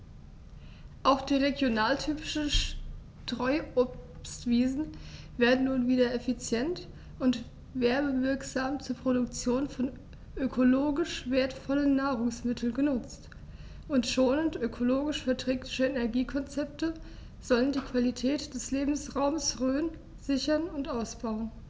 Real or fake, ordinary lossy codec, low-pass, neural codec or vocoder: real; none; none; none